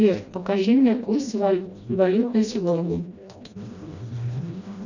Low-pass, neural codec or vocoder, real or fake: 7.2 kHz; codec, 16 kHz, 1 kbps, FreqCodec, smaller model; fake